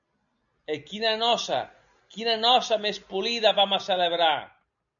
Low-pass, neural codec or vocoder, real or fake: 7.2 kHz; none; real